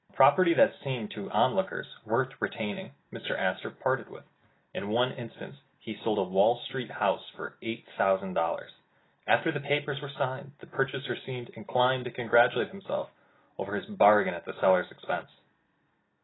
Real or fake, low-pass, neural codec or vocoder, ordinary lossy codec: real; 7.2 kHz; none; AAC, 16 kbps